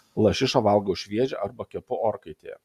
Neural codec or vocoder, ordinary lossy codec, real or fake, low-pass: none; Opus, 64 kbps; real; 14.4 kHz